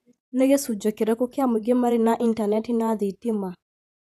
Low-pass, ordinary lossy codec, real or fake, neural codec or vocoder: 14.4 kHz; none; fake; vocoder, 48 kHz, 128 mel bands, Vocos